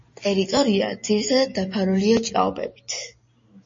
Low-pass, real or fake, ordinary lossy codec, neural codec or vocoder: 7.2 kHz; real; MP3, 32 kbps; none